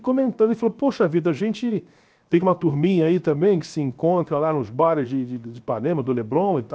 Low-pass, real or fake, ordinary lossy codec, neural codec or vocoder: none; fake; none; codec, 16 kHz, 0.7 kbps, FocalCodec